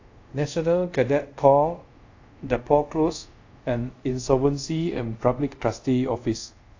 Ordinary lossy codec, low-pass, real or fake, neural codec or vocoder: AAC, 48 kbps; 7.2 kHz; fake; codec, 24 kHz, 0.5 kbps, DualCodec